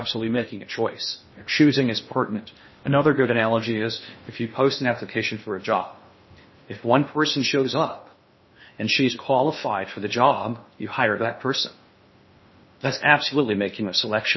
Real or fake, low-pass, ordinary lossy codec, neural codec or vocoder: fake; 7.2 kHz; MP3, 24 kbps; codec, 16 kHz in and 24 kHz out, 0.6 kbps, FocalCodec, streaming, 2048 codes